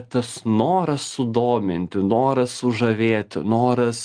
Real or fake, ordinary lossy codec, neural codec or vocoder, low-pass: real; Opus, 32 kbps; none; 9.9 kHz